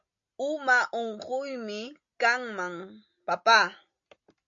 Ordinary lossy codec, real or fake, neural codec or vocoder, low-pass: AAC, 64 kbps; real; none; 7.2 kHz